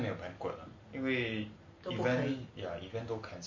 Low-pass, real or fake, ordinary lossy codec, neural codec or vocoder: 7.2 kHz; real; MP3, 32 kbps; none